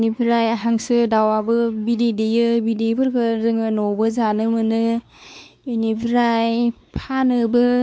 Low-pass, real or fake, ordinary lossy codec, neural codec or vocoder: none; fake; none; codec, 16 kHz, 4 kbps, X-Codec, WavLM features, trained on Multilingual LibriSpeech